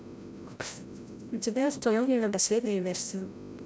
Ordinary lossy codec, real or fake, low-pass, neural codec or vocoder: none; fake; none; codec, 16 kHz, 0.5 kbps, FreqCodec, larger model